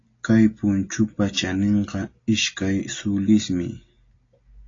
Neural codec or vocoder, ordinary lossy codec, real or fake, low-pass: none; AAC, 32 kbps; real; 7.2 kHz